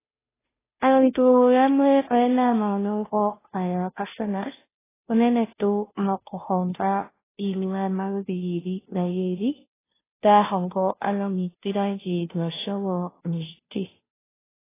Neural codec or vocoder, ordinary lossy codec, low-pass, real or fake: codec, 16 kHz, 0.5 kbps, FunCodec, trained on Chinese and English, 25 frames a second; AAC, 16 kbps; 3.6 kHz; fake